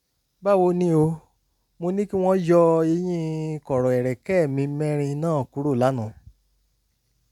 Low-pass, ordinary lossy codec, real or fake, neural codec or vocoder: 19.8 kHz; none; real; none